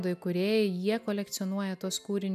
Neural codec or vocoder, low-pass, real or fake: none; 14.4 kHz; real